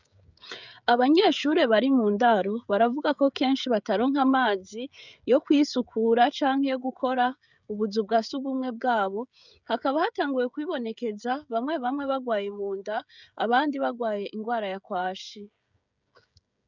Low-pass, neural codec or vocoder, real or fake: 7.2 kHz; codec, 16 kHz, 16 kbps, FreqCodec, smaller model; fake